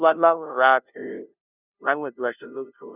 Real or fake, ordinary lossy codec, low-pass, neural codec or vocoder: fake; none; 3.6 kHz; codec, 16 kHz, 0.5 kbps, FunCodec, trained on LibriTTS, 25 frames a second